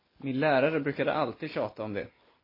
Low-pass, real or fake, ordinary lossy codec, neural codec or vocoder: 5.4 kHz; real; MP3, 24 kbps; none